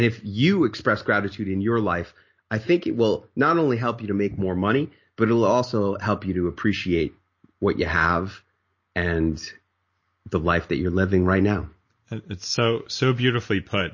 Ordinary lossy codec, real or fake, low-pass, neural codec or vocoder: MP3, 32 kbps; real; 7.2 kHz; none